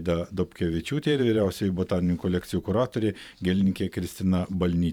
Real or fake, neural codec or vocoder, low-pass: fake; vocoder, 44.1 kHz, 128 mel bands every 512 samples, BigVGAN v2; 19.8 kHz